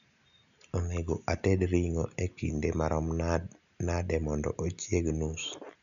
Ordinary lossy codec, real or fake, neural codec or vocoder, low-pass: none; real; none; 7.2 kHz